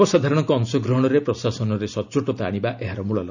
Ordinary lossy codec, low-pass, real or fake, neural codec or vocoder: none; 7.2 kHz; real; none